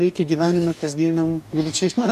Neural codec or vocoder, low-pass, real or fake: codec, 44.1 kHz, 2.6 kbps, DAC; 14.4 kHz; fake